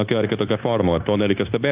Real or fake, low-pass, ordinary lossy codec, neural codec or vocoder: fake; 3.6 kHz; Opus, 32 kbps; codec, 16 kHz, 0.9 kbps, LongCat-Audio-Codec